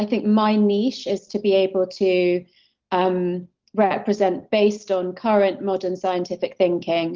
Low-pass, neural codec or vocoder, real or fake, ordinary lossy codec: 7.2 kHz; none; real; Opus, 16 kbps